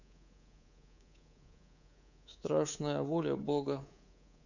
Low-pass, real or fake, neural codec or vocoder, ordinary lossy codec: 7.2 kHz; fake; codec, 24 kHz, 3.1 kbps, DualCodec; none